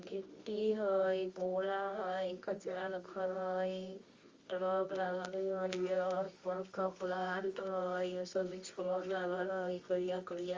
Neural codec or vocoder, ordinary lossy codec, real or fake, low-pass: codec, 24 kHz, 0.9 kbps, WavTokenizer, medium music audio release; Opus, 32 kbps; fake; 7.2 kHz